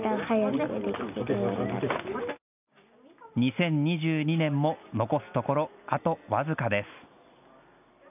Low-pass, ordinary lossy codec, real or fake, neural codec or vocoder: 3.6 kHz; none; real; none